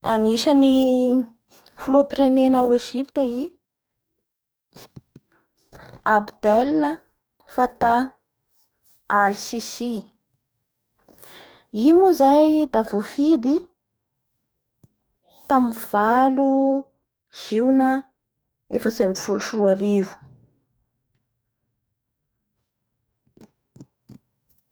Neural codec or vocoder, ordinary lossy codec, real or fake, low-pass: codec, 44.1 kHz, 2.6 kbps, DAC; none; fake; none